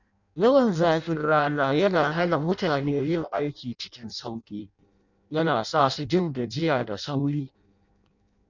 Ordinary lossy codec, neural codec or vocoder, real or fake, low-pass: none; codec, 16 kHz in and 24 kHz out, 0.6 kbps, FireRedTTS-2 codec; fake; 7.2 kHz